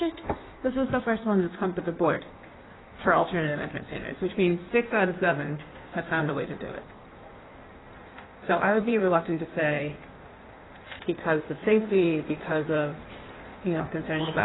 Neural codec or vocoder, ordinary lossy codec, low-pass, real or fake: codec, 16 kHz in and 24 kHz out, 1.1 kbps, FireRedTTS-2 codec; AAC, 16 kbps; 7.2 kHz; fake